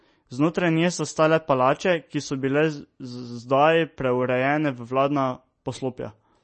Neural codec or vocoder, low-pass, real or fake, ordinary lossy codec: none; 10.8 kHz; real; MP3, 32 kbps